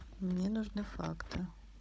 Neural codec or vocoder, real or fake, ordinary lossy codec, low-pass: codec, 16 kHz, 16 kbps, FunCodec, trained on Chinese and English, 50 frames a second; fake; none; none